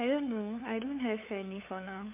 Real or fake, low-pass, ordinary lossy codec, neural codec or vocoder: fake; 3.6 kHz; MP3, 32 kbps; codec, 16 kHz, 8 kbps, FunCodec, trained on LibriTTS, 25 frames a second